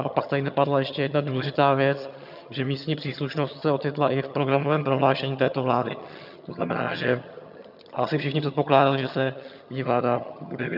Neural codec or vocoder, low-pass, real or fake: vocoder, 22.05 kHz, 80 mel bands, HiFi-GAN; 5.4 kHz; fake